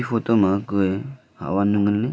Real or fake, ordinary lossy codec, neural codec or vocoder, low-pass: real; none; none; none